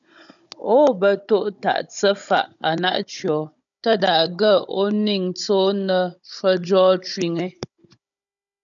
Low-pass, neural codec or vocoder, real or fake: 7.2 kHz; codec, 16 kHz, 16 kbps, FunCodec, trained on Chinese and English, 50 frames a second; fake